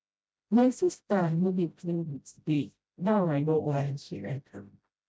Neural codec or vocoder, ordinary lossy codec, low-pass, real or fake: codec, 16 kHz, 0.5 kbps, FreqCodec, smaller model; none; none; fake